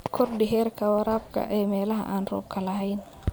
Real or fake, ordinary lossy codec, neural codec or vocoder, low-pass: fake; none; vocoder, 44.1 kHz, 128 mel bands every 512 samples, BigVGAN v2; none